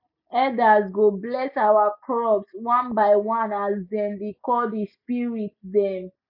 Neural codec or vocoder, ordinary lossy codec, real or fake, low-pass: none; none; real; 5.4 kHz